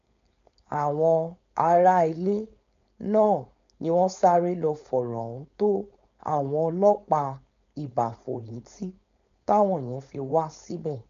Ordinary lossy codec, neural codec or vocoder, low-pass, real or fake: MP3, 64 kbps; codec, 16 kHz, 4.8 kbps, FACodec; 7.2 kHz; fake